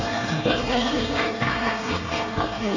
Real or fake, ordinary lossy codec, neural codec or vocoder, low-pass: fake; none; codec, 24 kHz, 1 kbps, SNAC; 7.2 kHz